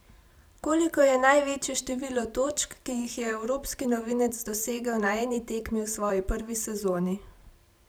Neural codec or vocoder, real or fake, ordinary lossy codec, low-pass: vocoder, 44.1 kHz, 128 mel bands every 512 samples, BigVGAN v2; fake; none; none